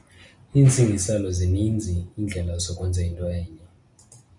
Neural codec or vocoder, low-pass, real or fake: none; 10.8 kHz; real